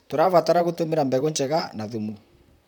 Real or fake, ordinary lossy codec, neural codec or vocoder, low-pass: fake; none; vocoder, 44.1 kHz, 128 mel bands, Pupu-Vocoder; 19.8 kHz